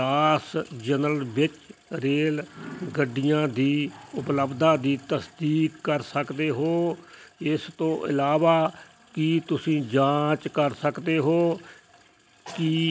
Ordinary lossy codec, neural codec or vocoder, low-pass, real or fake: none; none; none; real